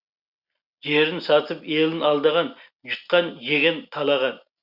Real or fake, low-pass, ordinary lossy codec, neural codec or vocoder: real; 5.4 kHz; Opus, 64 kbps; none